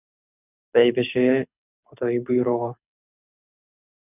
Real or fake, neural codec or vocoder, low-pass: fake; codec, 24 kHz, 6 kbps, HILCodec; 3.6 kHz